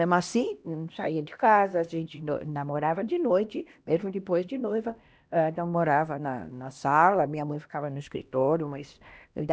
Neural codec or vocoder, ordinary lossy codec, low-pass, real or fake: codec, 16 kHz, 1 kbps, X-Codec, HuBERT features, trained on LibriSpeech; none; none; fake